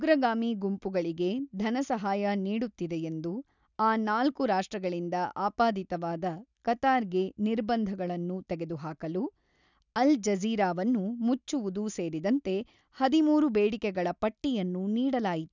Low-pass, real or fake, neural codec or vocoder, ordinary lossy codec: 7.2 kHz; real; none; none